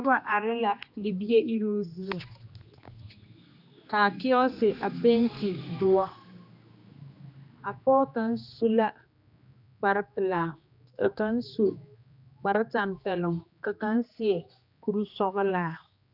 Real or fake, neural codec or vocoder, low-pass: fake; codec, 16 kHz, 2 kbps, X-Codec, HuBERT features, trained on general audio; 5.4 kHz